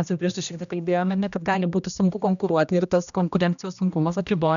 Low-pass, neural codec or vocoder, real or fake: 7.2 kHz; codec, 16 kHz, 1 kbps, X-Codec, HuBERT features, trained on general audio; fake